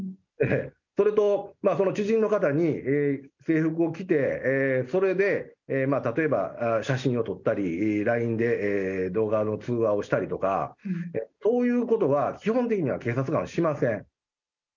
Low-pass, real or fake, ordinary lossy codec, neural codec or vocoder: 7.2 kHz; real; none; none